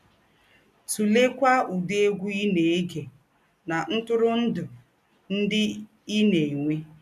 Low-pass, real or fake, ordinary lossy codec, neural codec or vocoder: 14.4 kHz; real; none; none